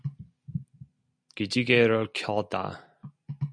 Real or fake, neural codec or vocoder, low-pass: real; none; 9.9 kHz